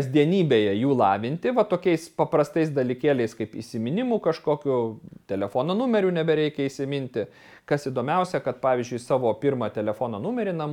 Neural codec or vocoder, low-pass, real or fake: none; 19.8 kHz; real